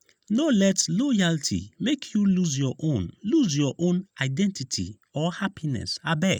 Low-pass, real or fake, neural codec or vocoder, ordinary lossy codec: 19.8 kHz; real; none; none